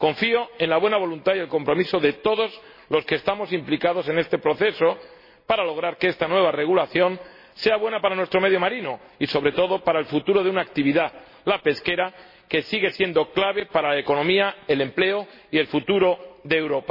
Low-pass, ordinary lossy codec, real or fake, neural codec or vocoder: 5.4 kHz; MP3, 24 kbps; real; none